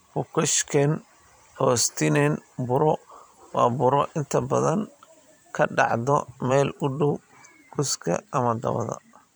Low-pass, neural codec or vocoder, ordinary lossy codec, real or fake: none; vocoder, 44.1 kHz, 128 mel bands every 512 samples, BigVGAN v2; none; fake